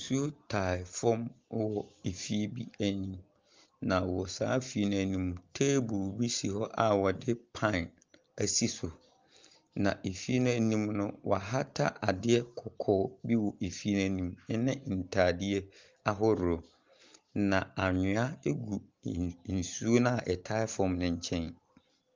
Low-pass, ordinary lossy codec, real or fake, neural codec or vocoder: 7.2 kHz; Opus, 32 kbps; real; none